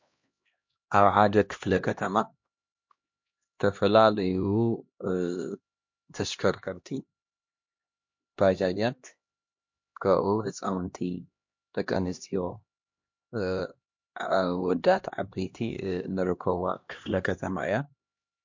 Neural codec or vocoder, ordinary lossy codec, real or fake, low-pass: codec, 16 kHz, 1 kbps, X-Codec, HuBERT features, trained on LibriSpeech; MP3, 48 kbps; fake; 7.2 kHz